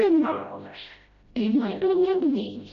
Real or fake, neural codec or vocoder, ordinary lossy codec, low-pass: fake; codec, 16 kHz, 0.5 kbps, FreqCodec, smaller model; AAC, 64 kbps; 7.2 kHz